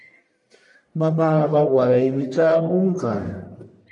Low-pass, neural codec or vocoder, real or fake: 10.8 kHz; codec, 44.1 kHz, 1.7 kbps, Pupu-Codec; fake